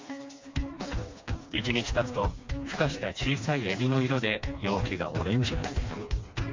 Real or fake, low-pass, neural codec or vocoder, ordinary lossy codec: fake; 7.2 kHz; codec, 16 kHz, 2 kbps, FreqCodec, smaller model; MP3, 48 kbps